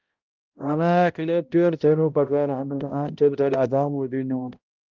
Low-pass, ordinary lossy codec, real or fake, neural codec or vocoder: 7.2 kHz; Opus, 32 kbps; fake; codec, 16 kHz, 0.5 kbps, X-Codec, HuBERT features, trained on balanced general audio